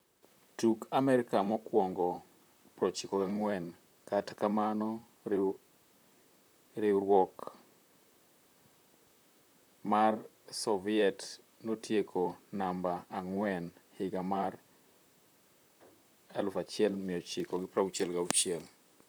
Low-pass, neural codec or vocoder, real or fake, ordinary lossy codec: none; vocoder, 44.1 kHz, 128 mel bands, Pupu-Vocoder; fake; none